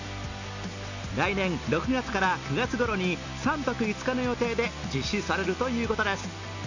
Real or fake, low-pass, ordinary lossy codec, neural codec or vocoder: real; 7.2 kHz; none; none